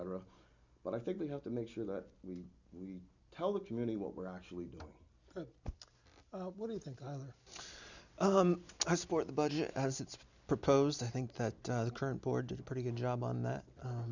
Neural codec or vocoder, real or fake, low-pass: none; real; 7.2 kHz